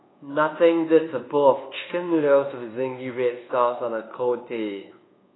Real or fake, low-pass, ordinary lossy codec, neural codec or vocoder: fake; 7.2 kHz; AAC, 16 kbps; codec, 24 kHz, 1.2 kbps, DualCodec